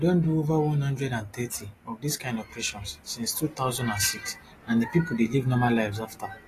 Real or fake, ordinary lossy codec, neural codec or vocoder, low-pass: real; AAC, 48 kbps; none; 14.4 kHz